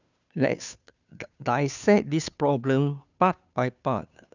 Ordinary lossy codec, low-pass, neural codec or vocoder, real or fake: none; 7.2 kHz; codec, 16 kHz, 2 kbps, FunCodec, trained on Chinese and English, 25 frames a second; fake